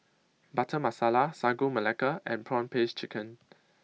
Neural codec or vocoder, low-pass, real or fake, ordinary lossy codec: none; none; real; none